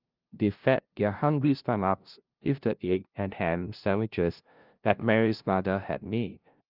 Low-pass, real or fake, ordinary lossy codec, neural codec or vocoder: 5.4 kHz; fake; Opus, 24 kbps; codec, 16 kHz, 0.5 kbps, FunCodec, trained on LibriTTS, 25 frames a second